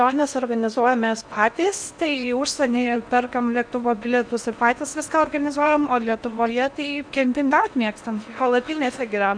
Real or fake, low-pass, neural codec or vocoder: fake; 9.9 kHz; codec, 16 kHz in and 24 kHz out, 0.8 kbps, FocalCodec, streaming, 65536 codes